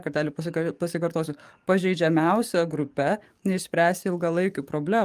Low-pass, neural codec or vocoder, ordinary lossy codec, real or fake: 14.4 kHz; codec, 44.1 kHz, 7.8 kbps, DAC; Opus, 32 kbps; fake